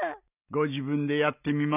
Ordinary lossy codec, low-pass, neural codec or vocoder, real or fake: none; 3.6 kHz; none; real